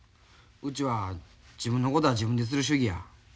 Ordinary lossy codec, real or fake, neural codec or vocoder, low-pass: none; real; none; none